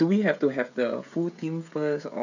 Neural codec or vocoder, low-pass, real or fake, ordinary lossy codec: codec, 16 kHz, 16 kbps, FreqCodec, smaller model; 7.2 kHz; fake; none